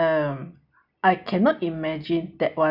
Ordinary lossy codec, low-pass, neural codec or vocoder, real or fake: none; 5.4 kHz; none; real